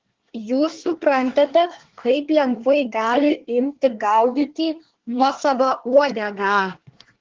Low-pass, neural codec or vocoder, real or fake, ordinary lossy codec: 7.2 kHz; codec, 24 kHz, 1 kbps, SNAC; fake; Opus, 16 kbps